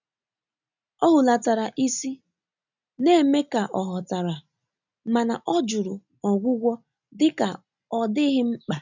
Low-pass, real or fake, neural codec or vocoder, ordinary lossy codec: 7.2 kHz; real; none; none